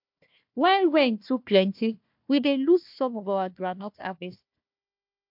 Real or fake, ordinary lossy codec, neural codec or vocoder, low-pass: fake; MP3, 48 kbps; codec, 16 kHz, 1 kbps, FunCodec, trained on Chinese and English, 50 frames a second; 5.4 kHz